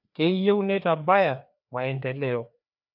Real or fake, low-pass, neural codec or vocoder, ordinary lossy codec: fake; 5.4 kHz; codec, 16 kHz, 2 kbps, FreqCodec, larger model; none